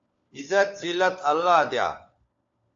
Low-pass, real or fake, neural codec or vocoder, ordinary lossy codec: 7.2 kHz; fake; codec, 16 kHz, 4 kbps, FunCodec, trained on LibriTTS, 50 frames a second; AAC, 64 kbps